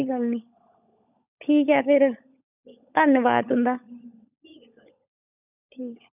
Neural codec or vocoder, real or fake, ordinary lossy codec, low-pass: codec, 16 kHz, 16 kbps, FunCodec, trained on LibriTTS, 50 frames a second; fake; none; 3.6 kHz